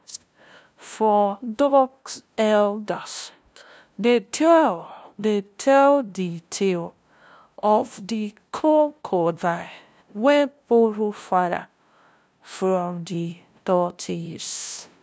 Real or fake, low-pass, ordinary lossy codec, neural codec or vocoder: fake; none; none; codec, 16 kHz, 0.5 kbps, FunCodec, trained on LibriTTS, 25 frames a second